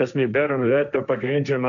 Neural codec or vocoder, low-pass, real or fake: codec, 16 kHz, 1.1 kbps, Voila-Tokenizer; 7.2 kHz; fake